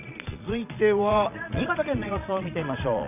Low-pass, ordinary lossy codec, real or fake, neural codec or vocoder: 3.6 kHz; none; fake; vocoder, 22.05 kHz, 80 mel bands, Vocos